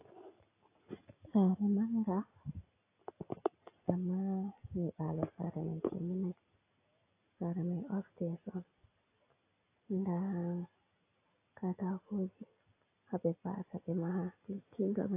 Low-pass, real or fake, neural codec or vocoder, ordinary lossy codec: 3.6 kHz; real; none; AAC, 24 kbps